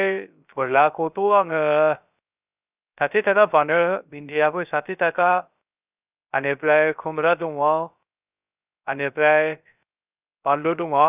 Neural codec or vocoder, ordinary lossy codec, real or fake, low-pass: codec, 16 kHz, 0.3 kbps, FocalCodec; none; fake; 3.6 kHz